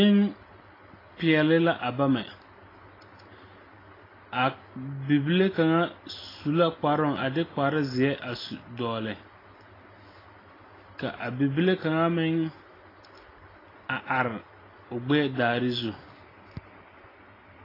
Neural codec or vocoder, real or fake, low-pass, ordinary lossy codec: none; real; 5.4 kHz; AAC, 24 kbps